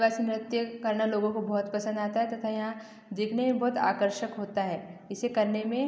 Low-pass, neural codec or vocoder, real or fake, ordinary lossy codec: none; none; real; none